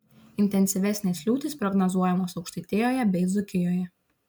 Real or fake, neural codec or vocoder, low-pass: real; none; 19.8 kHz